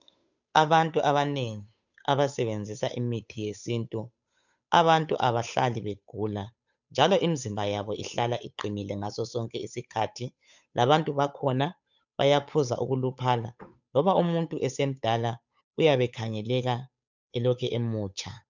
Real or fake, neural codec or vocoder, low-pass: fake; codec, 16 kHz, 8 kbps, FunCodec, trained on Chinese and English, 25 frames a second; 7.2 kHz